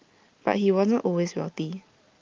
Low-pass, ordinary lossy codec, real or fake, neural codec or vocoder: 7.2 kHz; Opus, 32 kbps; real; none